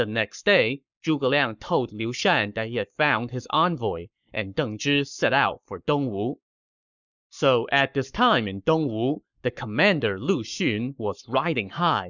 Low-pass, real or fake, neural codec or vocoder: 7.2 kHz; fake; codec, 44.1 kHz, 7.8 kbps, Pupu-Codec